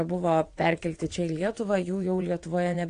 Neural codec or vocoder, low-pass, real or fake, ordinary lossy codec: vocoder, 22.05 kHz, 80 mel bands, WaveNeXt; 9.9 kHz; fake; AAC, 48 kbps